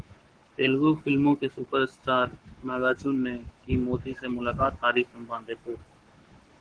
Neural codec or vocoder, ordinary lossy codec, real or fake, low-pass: codec, 44.1 kHz, 7.8 kbps, Pupu-Codec; Opus, 16 kbps; fake; 9.9 kHz